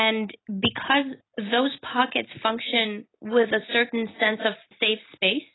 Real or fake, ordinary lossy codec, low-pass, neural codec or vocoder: real; AAC, 16 kbps; 7.2 kHz; none